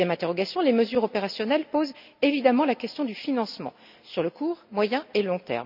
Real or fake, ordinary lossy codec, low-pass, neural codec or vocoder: real; none; 5.4 kHz; none